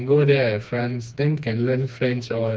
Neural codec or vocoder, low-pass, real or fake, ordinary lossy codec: codec, 16 kHz, 2 kbps, FreqCodec, smaller model; none; fake; none